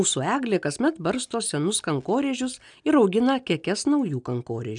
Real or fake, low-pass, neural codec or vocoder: real; 9.9 kHz; none